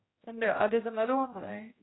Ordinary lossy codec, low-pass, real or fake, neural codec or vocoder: AAC, 16 kbps; 7.2 kHz; fake; codec, 16 kHz, 0.5 kbps, X-Codec, HuBERT features, trained on general audio